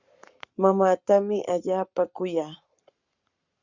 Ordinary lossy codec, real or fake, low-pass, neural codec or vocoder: Opus, 64 kbps; fake; 7.2 kHz; codec, 44.1 kHz, 7.8 kbps, Pupu-Codec